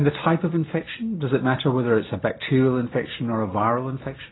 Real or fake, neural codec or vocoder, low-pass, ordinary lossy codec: real; none; 7.2 kHz; AAC, 16 kbps